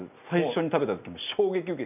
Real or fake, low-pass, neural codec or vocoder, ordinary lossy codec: real; 3.6 kHz; none; none